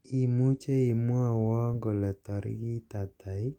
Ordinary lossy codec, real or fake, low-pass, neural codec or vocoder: Opus, 32 kbps; real; 19.8 kHz; none